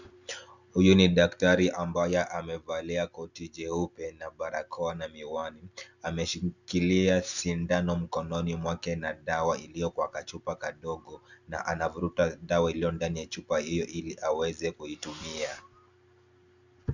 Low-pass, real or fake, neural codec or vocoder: 7.2 kHz; real; none